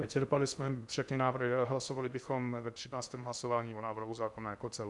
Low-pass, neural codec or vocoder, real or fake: 10.8 kHz; codec, 16 kHz in and 24 kHz out, 0.6 kbps, FocalCodec, streaming, 2048 codes; fake